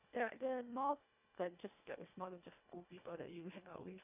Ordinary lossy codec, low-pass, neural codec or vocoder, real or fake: none; 3.6 kHz; codec, 24 kHz, 1.5 kbps, HILCodec; fake